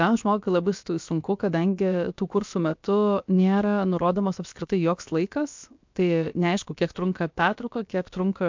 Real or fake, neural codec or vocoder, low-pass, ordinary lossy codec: fake; codec, 16 kHz, about 1 kbps, DyCAST, with the encoder's durations; 7.2 kHz; MP3, 64 kbps